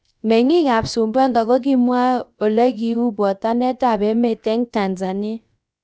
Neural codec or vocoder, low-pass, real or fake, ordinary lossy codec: codec, 16 kHz, about 1 kbps, DyCAST, with the encoder's durations; none; fake; none